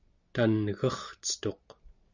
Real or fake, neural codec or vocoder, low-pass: real; none; 7.2 kHz